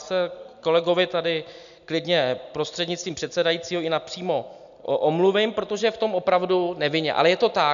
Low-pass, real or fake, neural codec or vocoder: 7.2 kHz; real; none